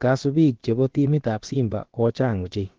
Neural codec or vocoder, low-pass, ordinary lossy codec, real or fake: codec, 16 kHz, about 1 kbps, DyCAST, with the encoder's durations; 7.2 kHz; Opus, 16 kbps; fake